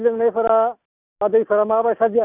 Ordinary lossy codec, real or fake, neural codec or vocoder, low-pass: none; real; none; 3.6 kHz